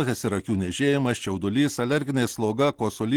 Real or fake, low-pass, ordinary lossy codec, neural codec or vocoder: real; 19.8 kHz; Opus, 16 kbps; none